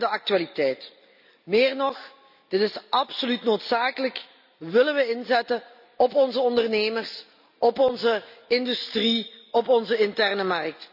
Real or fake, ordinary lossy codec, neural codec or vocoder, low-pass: real; none; none; 5.4 kHz